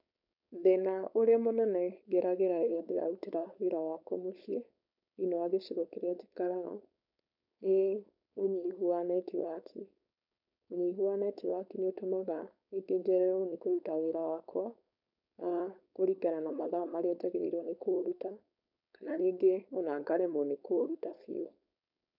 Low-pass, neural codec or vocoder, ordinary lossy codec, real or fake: 5.4 kHz; codec, 16 kHz, 4.8 kbps, FACodec; none; fake